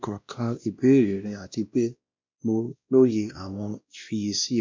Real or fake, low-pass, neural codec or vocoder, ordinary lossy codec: fake; 7.2 kHz; codec, 16 kHz, 1 kbps, X-Codec, WavLM features, trained on Multilingual LibriSpeech; MP3, 64 kbps